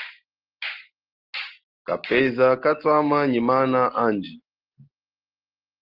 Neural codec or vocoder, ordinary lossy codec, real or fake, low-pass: none; Opus, 16 kbps; real; 5.4 kHz